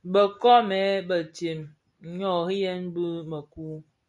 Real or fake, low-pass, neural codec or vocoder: real; 10.8 kHz; none